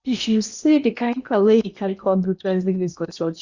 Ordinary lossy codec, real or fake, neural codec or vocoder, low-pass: Opus, 64 kbps; fake; codec, 16 kHz in and 24 kHz out, 0.8 kbps, FocalCodec, streaming, 65536 codes; 7.2 kHz